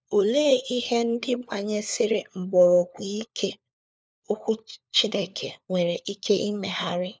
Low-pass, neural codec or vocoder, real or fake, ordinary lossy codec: none; codec, 16 kHz, 4 kbps, FunCodec, trained on LibriTTS, 50 frames a second; fake; none